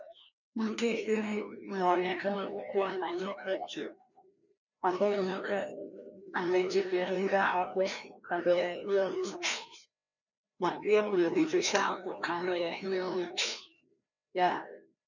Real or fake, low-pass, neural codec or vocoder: fake; 7.2 kHz; codec, 16 kHz, 1 kbps, FreqCodec, larger model